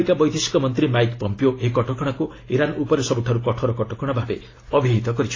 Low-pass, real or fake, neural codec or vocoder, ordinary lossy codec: 7.2 kHz; real; none; AAC, 32 kbps